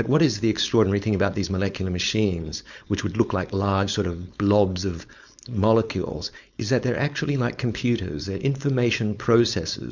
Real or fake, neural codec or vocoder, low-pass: fake; codec, 16 kHz, 4.8 kbps, FACodec; 7.2 kHz